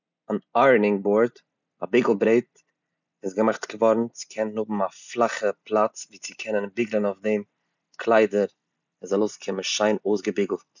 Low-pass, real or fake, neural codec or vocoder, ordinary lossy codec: 7.2 kHz; real; none; none